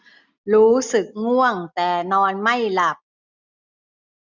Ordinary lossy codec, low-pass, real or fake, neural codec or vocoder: none; 7.2 kHz; real; none